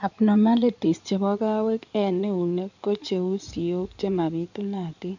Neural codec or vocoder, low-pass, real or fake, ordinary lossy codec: codec, 16 kHz in and 24 kHz out, 2.2 kbps, FireRedTTS-2 codec; 7.2 kHz; fake; none